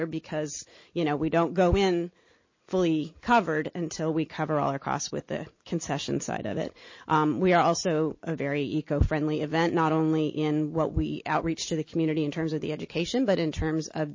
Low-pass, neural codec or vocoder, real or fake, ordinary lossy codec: 7.2 kHz; none; real; MP3, 32 kbps